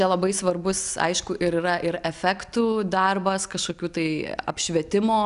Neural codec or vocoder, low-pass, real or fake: none; 10.8 kHz; real